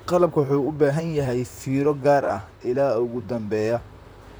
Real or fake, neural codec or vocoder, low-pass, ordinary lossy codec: fake; vocoder, 44.1 kHz, 128 mel bands, Pupu-Vocoder; none; none